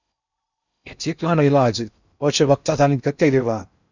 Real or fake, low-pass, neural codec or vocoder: fake; 7.2 kHz; codec, 16 kHz in and 24 kHz out, 0.6 kbps, FocalCodec, streaming, 2048 codes